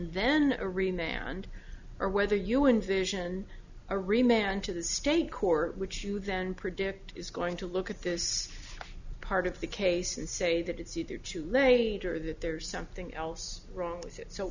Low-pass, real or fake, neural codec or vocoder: 7.2 kHz; real; none